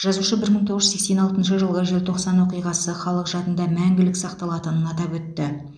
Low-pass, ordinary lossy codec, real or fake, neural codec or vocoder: 9.9 kHz; none; real; none